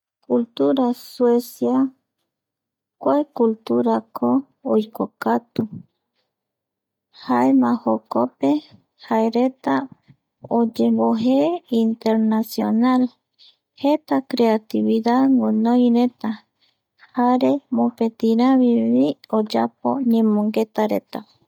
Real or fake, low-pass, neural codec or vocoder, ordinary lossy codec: real; 19.8 kHz; none; none